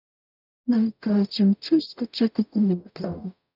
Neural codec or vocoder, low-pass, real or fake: codec, 44.1 kHz, 0.9 kbps, DAC; 5.4 kHz; fake